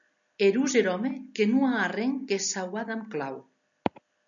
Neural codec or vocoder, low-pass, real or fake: none; 7.2 kHz; real